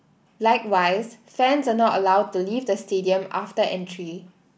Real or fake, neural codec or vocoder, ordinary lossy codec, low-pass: real; none; none; none